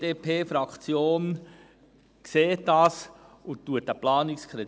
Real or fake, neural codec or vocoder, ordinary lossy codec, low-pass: real; none; none; none